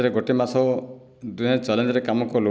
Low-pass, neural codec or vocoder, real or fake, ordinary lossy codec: none; none; real; none